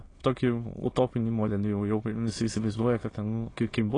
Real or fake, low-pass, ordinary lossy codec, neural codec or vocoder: fake; 9.9 kHz; AAC, 32 kbps; autoencoder, 22.05 kHz, a latent of 192 numbers a frame, VITS, trained on many speakers